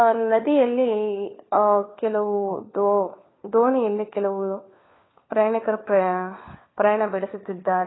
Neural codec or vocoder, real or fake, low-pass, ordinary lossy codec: codec, 16 kHz, 4 kbps, FunCodec, trained on LibriTTS, 50 frames a second; fake; 7.2 kHz; AAC, 16 kbps